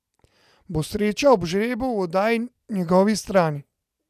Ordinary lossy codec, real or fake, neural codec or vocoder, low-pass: none; real; none; 14.4 kHz